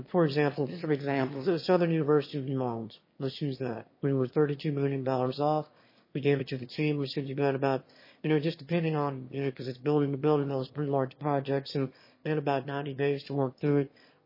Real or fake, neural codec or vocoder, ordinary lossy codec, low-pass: fake; autoencoder, 22.05 kHz, a latent of 192 numbers a frame, VITS, trained on one speaker; MP3, 24 kbps; 5.4 kHz